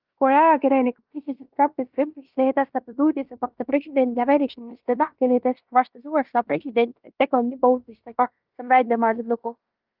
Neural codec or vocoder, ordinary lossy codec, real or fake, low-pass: codec, 16 kHz in and 24 kHz out, 0.9 kbps, LongCat-Audio-Codec, fine tuned four codebook decoder; Opus, 24 kbps; fake; 5.4 kHz